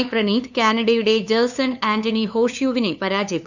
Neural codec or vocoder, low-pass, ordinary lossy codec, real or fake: codec, 16 kHz, 2 kbps, FunCodec, trained on LibriTTS, 25 frames a second; 7.2 kHz; none; fake